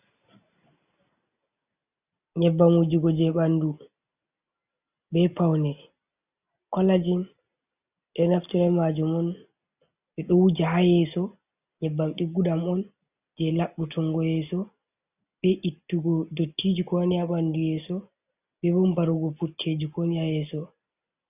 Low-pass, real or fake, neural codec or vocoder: 3.6 kHz; real; none